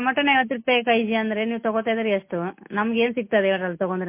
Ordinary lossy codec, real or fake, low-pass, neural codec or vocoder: MP3, 24 kbps; real; 3.6 kHz; none